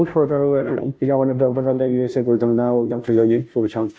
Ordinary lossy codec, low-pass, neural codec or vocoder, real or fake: none; none; codec, 16 kHz, 0.5 kbps, FunCodec, trained on Chinese and English, 25 frames a second; fake